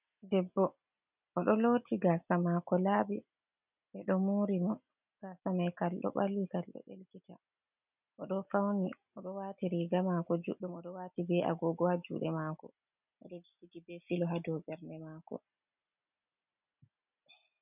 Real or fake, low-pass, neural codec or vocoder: real; 3.6 kHz; none